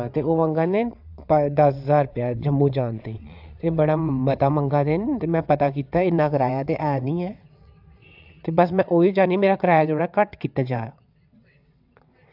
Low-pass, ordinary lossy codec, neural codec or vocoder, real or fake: 5.4 kHz; AAC, 48 kbps; vocoder, 22.05 kHz, 80 mel bands, WaveNeXt; fake